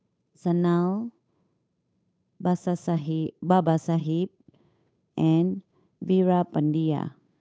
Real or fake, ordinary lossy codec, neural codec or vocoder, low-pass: fake; none; codec, 16 kHz, 8 kbps, FunCodec, trained on Chinese and English, 25 frames a second; none